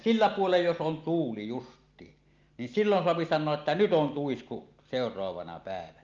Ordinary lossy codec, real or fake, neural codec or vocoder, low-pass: Opus, 24 kbps; real; none; 7.2 kHz